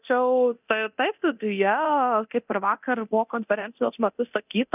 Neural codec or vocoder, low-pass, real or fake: codec, 24 kHz, 0.9 kbps, DualCodec; 3.6 kHz; fake